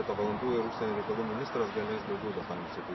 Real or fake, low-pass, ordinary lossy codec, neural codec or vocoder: real; 7.2 kHz; MP3, 24 kbps; none